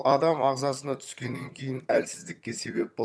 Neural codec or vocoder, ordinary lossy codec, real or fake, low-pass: vocoder, 22.05 kHz, 80 mel bands, HiFi-GAN; none; fake; none